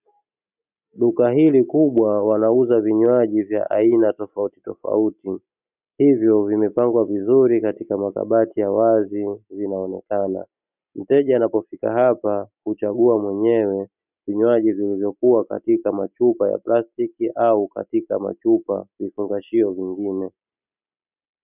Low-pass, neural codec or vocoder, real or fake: 3.6 kHz; none; real